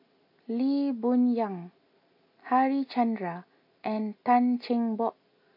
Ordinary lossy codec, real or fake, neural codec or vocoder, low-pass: AAC, 32 kbps; real; none; 5.4 kHz